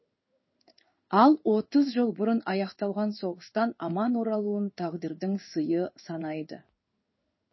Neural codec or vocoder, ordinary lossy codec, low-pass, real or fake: codec, 16 kHz in and 24 kHz out, 1 kbps, XY-Tokenizer; MP3, 24 kbps; 7.2 kHz; fake